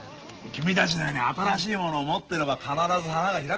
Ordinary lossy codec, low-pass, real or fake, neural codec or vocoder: Opus, 16 kbps; 7.2 kHz; real; none